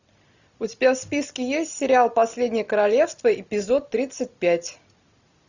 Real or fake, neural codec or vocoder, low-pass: real; none; 7.2 kHz